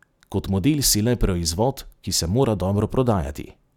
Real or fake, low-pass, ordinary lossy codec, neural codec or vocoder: real; 19.8 kHz; none; none